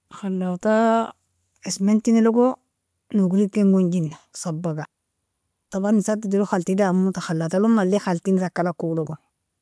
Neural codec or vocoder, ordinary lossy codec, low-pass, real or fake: none; none; none; real